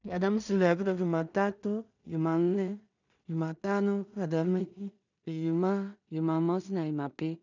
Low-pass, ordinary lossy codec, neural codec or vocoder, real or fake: 7.2 kHz; none; codec, 16 kHz in and 24 kHz out, 0.4 kbps, LongCat-Audio-Codec, two codebook decoder; fake